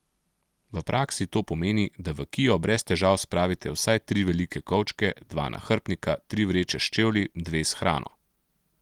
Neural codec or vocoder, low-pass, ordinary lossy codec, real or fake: none; 19.8 kHz; Opus, 24 kbps; real